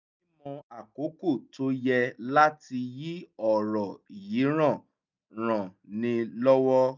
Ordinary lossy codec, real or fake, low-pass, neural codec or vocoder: none; real; 7.2 kHz; none